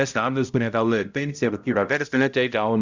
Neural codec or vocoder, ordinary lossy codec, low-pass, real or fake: codec, 16 kHz, 0.5 kbps, X-Codec, HuBERT features, trained on balanced general audio; Opus, 64 kbps; 7.2 kHz; fake